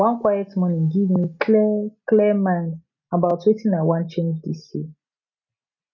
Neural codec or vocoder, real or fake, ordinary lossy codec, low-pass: none; real; none; 7.2 kHz